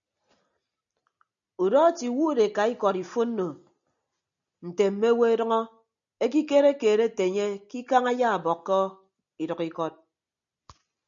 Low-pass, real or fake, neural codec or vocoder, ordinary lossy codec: 7.2 kHz; real; none; MP3, 96 kbps